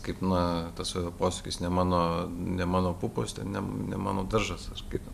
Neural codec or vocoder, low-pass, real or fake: none; 14.4 kHz; real